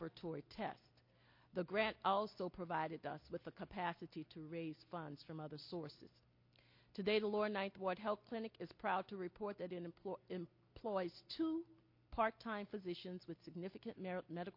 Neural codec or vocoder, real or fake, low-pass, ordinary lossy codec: none; real; 5.4 kHz; MP3, 32 kbps